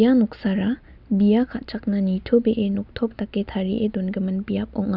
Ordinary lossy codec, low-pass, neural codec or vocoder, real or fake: none; 5.4 kHz; none; real